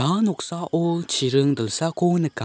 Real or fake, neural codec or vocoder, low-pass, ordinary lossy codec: real; none; none; none